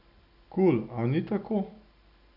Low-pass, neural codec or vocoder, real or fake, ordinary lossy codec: 5.4 kHz; none; real; none